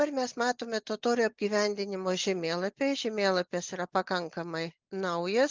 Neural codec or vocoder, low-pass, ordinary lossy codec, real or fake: none; 7.2 kHz; Opus, 24 kbps; real